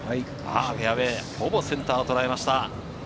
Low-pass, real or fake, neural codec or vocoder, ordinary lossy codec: none; real; none; none